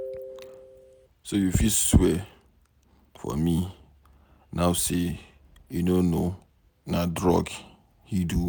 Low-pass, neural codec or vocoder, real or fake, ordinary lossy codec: none; none; real; none